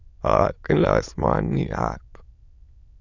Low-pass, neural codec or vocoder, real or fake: 7.2 kHz; autoencoder, 22.05 kHz, a latent of 192 numbers a frame, VITS, trained on many speakers; fake